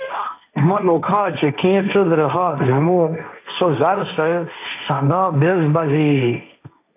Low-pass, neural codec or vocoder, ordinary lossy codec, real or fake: 3.6 kHz; codec, 16 kHz, 1.1 kbps, Voila-Tokenizer; AAC, 24 kbps; fake